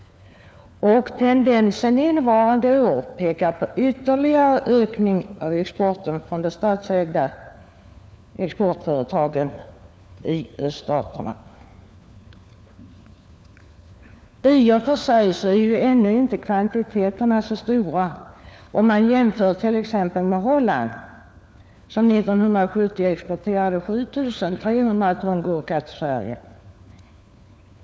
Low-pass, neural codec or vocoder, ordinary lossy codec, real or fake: none; codec, 16 kHz, 4 kbps, FunCodec, trained on LibriTTS, 50 frames a second; none; fake